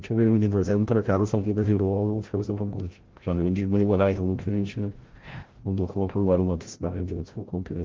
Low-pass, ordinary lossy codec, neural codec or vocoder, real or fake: 7.2 kHz; Opus, 16 kbps; codec, 16 kHz, 0.5 kbps, FreqCodec, larger model; fake